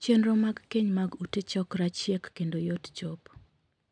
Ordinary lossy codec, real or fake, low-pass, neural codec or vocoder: none; real; 9.9 kHz; none